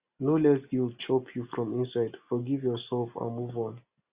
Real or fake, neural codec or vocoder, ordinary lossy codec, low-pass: real; none; Opus, 64 kbps; 3.6 kHz